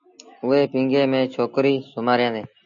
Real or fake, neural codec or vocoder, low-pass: real; none; 7.2 kHz